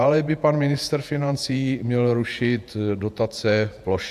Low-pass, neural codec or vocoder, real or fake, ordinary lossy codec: 14.4 kHz; vocoder, 48 kHz, 128 mel bands, Vocos; fake; AAC, 96 kbps